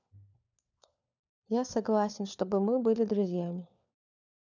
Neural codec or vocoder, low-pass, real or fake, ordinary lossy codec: codec, 16 kHz, 4 kbps, FunCodec, trained on LibriTTS, 50 frames a second; 7.2 kHz; fake; none